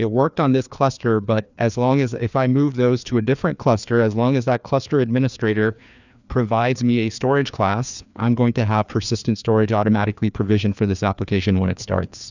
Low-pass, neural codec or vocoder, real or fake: 7.2 kHz; codec, 16 kHz, 2 kbps, FreqCodec, larger model; fake